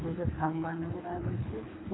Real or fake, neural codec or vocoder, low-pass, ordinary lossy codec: fake; codec, 24 kHz, 3 kbps, HILCodec; 7.2 kHz; AAC, 16 kbps